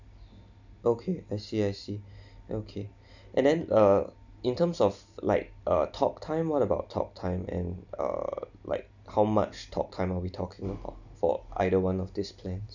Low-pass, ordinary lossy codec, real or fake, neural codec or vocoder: 7.2 kHz; none; real; none